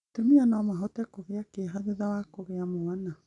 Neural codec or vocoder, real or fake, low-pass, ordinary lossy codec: none; real; 10.8 kHz; none